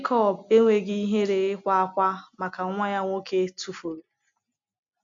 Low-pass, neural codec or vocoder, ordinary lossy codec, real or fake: 7.2 kHz; none; AAC, 64 kbps; real